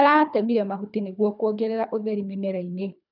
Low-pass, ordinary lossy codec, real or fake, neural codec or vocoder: 5.4 kHz; none; fake; codec, 24 kHz, 3 kbps, HILCodec